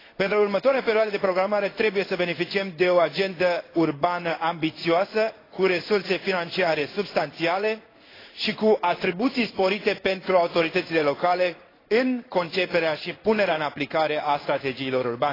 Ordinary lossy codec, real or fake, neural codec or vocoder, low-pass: AAC, 24 kbps; fake; codec, 16 kHz in and 24 kHz out, 1 kbps, XY-Tokenizer; 5.4 kHz